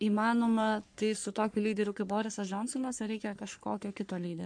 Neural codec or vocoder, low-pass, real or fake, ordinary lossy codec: codec, 44.1 kHz, 3.4 kbps, Pupu-Codec; 9.9 kHz; fake; MP3, 64 kbps